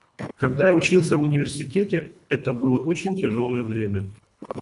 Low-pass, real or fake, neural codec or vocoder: 10.8 kHz; fake; codec, 24 kHz, 1.5 kbps, HILCodec